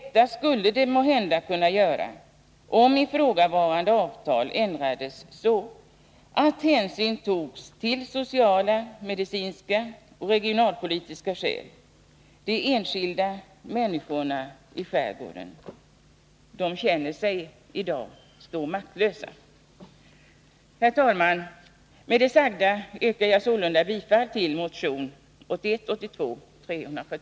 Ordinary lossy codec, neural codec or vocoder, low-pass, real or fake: none; none; none; real